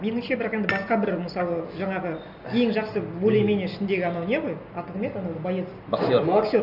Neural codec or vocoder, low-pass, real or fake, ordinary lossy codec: none; 5.4 kHz; real; none